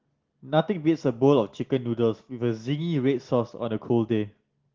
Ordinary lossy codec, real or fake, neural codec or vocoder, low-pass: Opus, 16 kbps; real; none; 7.2 kHz